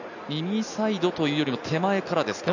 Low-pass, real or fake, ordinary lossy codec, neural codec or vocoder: 7.2 kHz; real; none; none